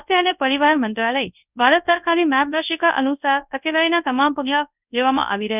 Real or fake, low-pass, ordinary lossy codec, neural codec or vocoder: fake; 3.6 kHz; none; codec, 24 kHz, 0.9 kbps, WavTokenizer, large speech release